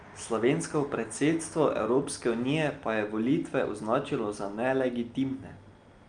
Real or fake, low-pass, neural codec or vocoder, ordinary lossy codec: real; 9.9 kHz; none; Opus, 32 kbps